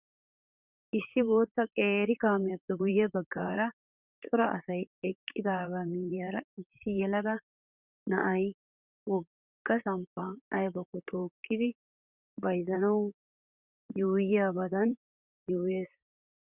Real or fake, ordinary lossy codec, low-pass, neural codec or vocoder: fake; Opus, 64 kbps; 3.6 kHz; vocoder, 44.1 kHz, 128 mel bands, Pupu-Vocoder